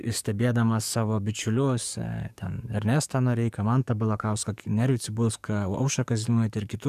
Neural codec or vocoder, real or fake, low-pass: codec, 44.1 kHz, 7.8 kbps, DAC; fake; 14.4 kHz